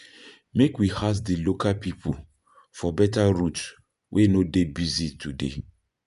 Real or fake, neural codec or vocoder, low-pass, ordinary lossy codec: fake; vocoder, 24 kHz, 100 mel bands, Vocos; 10.8 kHz; none